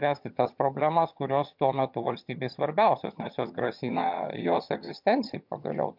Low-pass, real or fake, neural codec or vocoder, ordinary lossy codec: 5.4 kHz; fake; vocoder, 22.05 kHz, 80 mel bands, HiFi-GAN; MP3, 48 kbps